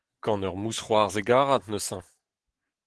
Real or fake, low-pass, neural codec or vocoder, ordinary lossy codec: fake; 10.8 kHz; autoencoder, 48 kHz, 128 numbers a frame, DAC-VAE, trained on Japanese speech; Opus, 16 kbps